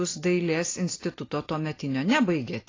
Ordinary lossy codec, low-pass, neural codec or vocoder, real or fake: AAC, 32 kbps; 7.2 kHz; none; real